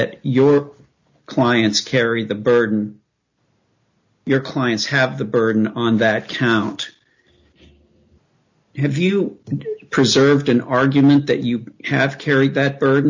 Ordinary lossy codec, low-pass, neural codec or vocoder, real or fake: MP3, 64 kbps; 7.2 kHz; none; real